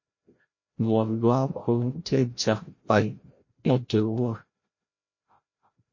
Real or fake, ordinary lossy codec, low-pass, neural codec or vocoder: fake; MP3, 32 kbps; 7.2 kHz; codec, 16 kHz, 0.5 kbps, FreqCodec, larger model